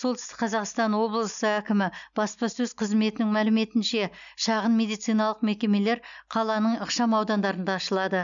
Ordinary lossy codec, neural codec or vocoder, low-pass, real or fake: none; none; 7.2 kHz; real